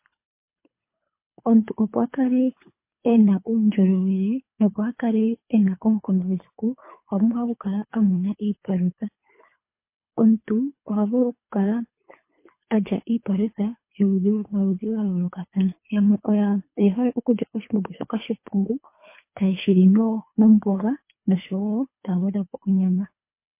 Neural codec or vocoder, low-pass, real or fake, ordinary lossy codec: codec, 24 kHz, 3 kbps, HILCodec; 3.6 kHz; fake; MP3, 24 kbps